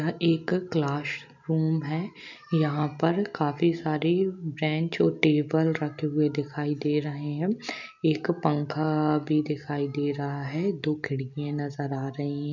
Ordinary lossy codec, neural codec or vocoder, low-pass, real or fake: none; none; 7.2 kHz; real